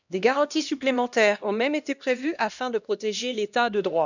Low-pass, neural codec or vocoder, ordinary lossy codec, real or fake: 7.2 kHz; codec, 16 kHz, 1 kbps, X-Codec, HuBERT features, trained on LibriSpeech; none; fake